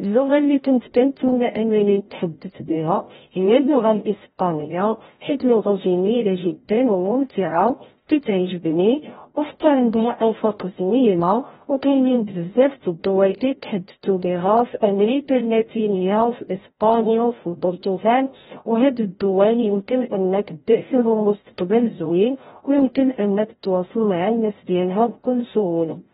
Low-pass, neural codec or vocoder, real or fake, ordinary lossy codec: 7.2 kHz; codec, 16 kHz, 0.5 kbps, FreqCodec, larger model; fake; AAC, 16 kbps